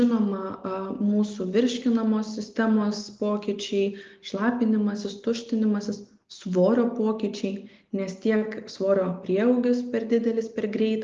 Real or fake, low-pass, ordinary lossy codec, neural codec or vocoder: real; 7.2 kHz; Opus, 32 kbps; none